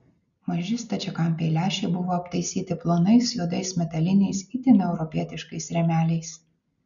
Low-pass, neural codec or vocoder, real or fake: 7.2 kHz; none; real